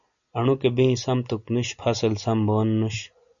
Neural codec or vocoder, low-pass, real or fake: none; 7.2 kHz; real